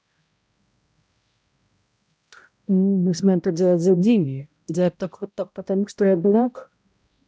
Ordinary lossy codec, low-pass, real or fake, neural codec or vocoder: none; none; fake; codec, 16 kHz, 0.5 kbps, X-Codec, HuBERT features, trained on balanced general audio